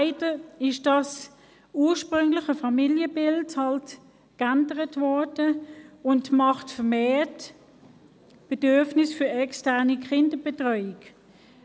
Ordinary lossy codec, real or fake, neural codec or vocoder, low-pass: none; real; none; none